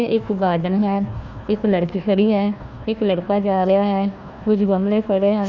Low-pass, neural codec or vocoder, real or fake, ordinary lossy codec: 7.2 kHz; codec, 16 kHz, 1 kbps, FunCodec, trained on Chinese and English, 50 frames a second; fake; none